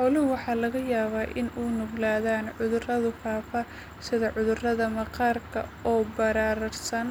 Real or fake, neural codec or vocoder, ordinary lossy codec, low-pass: real; none; none; none